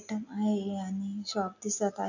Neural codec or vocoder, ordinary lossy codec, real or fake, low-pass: none; none; real; 7.2 kHz